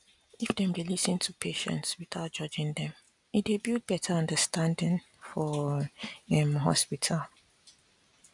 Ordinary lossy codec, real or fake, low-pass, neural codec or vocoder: none; real; 10.8 kHz; none